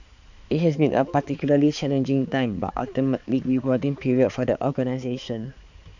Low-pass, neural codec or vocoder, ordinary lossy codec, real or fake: 7.2 kHz; codec, 16 kHz, 4 kbps, X-Codec, HuBERT features, trained on balanced general audio; none; fake